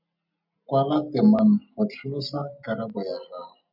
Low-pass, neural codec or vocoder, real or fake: 5.4 kHz; none; real